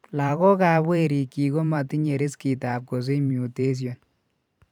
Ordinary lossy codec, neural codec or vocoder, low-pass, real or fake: none; vocoder, 44.1 kHz, 128 mel bands every 512 samples, BigVGAN v2; 19.8 kHz; fake